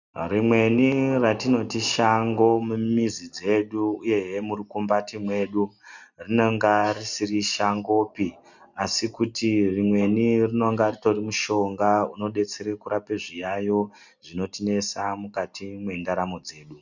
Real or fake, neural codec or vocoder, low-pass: real; none; 7.2 kHz